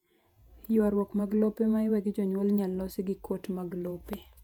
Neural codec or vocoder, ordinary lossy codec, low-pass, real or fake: vocoder, 48 kHz, 128 mel bands, Vocos; none; 19.8 kHz; fake